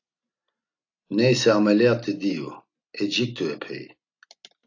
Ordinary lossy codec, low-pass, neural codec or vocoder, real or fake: AAC, 48 kbps; 7.2 kHz; none; real